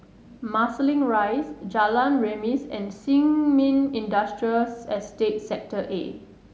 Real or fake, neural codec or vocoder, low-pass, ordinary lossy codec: real; none; none; none